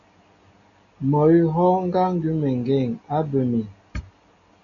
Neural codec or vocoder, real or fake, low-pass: none; real; 7.2 kHz